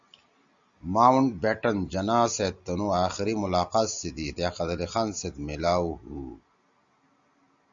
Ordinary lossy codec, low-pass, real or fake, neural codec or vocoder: Opus, 64 kbps; 7.2 kHz; real; none